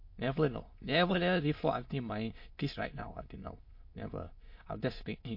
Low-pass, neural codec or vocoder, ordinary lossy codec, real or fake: 5.4 kHz; autoencoder, 22.05 kHz, a latent of 192 numbers a frame, VITS, trained on many speakers; MP3, 32 kbps; fake